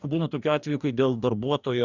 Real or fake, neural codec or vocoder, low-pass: fake; codec, 44.1 kHz, 2.6 kbps, DAC; 7.2 kHz